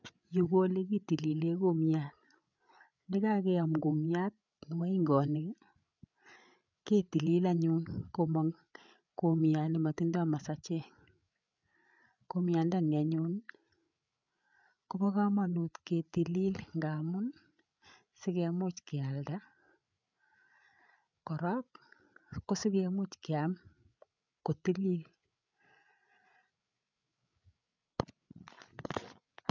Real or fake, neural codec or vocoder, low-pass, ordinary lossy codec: fake; codec, 16 kHz, 8 kbps, FreqCodec, larger model; 7.2 kHz; none